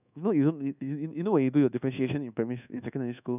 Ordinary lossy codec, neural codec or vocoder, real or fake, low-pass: none; codec, 24 kHz, 1.2 kbps, DualCodec; fake; 3.6 kHz